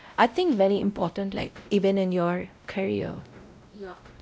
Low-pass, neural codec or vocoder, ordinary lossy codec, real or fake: none; codec, 16 kHz, 0.5 kbps, X-Codec, WavLM features, trained on Multilingual LibriSpeech; none; fake